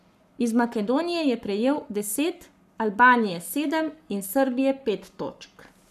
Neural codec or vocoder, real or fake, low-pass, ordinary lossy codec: codec, 44.1 kHz, 7.8 kbps, Pupu-Codec; fake; 14.4 kHz; none